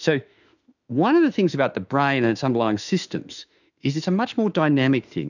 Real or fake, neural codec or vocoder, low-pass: fake; autoencoder, 48 kHz, 32 numbers a frame, DAC-VAE, trained on Japanese speech; 7.2 kHz